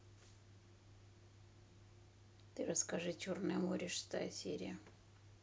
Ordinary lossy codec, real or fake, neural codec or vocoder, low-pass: none; real; none; none